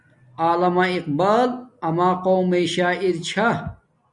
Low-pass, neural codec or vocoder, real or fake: 10.8 kHz; none; real